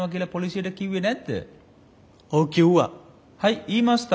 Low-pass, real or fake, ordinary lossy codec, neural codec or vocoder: none; real; none; none